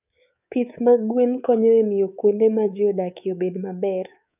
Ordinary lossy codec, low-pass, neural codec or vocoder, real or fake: none; 3.6 kHz; codec, 24 kHz, 3.1 kbps, DualCodec; fake